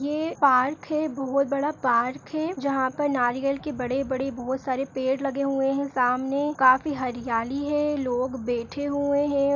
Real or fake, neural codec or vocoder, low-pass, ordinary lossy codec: real; none; 7.2 kHz; none